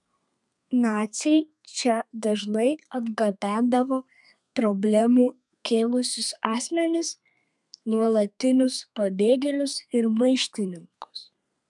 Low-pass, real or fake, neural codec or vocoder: 10.8 kHz; fake; codec, 32 kHz, 1.9 kbps, SNAC